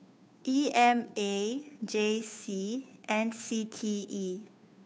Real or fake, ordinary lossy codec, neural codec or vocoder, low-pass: fake; none; codec, 16 kHz, 2 kbps, FunCodec, trained on Chinese and English, 25 frames a second; none